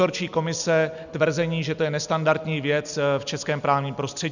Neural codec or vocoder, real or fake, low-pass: none; real; 7.2 kHz